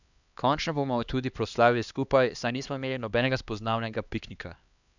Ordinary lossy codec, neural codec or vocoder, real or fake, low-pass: none; codec, 16 kHz, 2 kbps, X-Codec, HuBERT features, trained on LibriSpeech; fake; 7.2 kHz